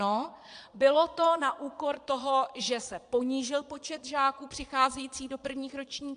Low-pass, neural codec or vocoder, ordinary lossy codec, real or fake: 9.9 kHz; vocoder, 22.05 kHz, 80 mel bands, Vocos; AAC, 64 kbps; fake